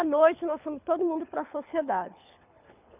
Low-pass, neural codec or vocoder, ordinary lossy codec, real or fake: 3.6 kHz; codec, 24 kHz, 6 kbps, HILCodec; none; fake